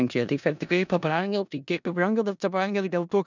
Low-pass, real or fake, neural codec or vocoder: 7.2 kHz; fake; codec, 16 kHz in and 24 kHz out, 0.4 kbps, LongCat-Audio-Codec, four codebook decoder